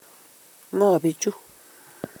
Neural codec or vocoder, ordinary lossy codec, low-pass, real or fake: vocoder, 44.1 kHz, 128 mel bands, Pupu-Vocoder; none; none; fake